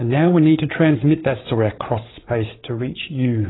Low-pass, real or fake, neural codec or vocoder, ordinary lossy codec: 7.2 kHz; fake; codec, 16 kHz, 8 kbps, FreqCodec, larger model; AAC, 16 kbps